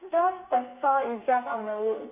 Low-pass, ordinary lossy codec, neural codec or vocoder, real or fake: 3.6 kHz; AAC, 32 kbps; codec, 32 kHz, 1.9 kbps, SNAC; fake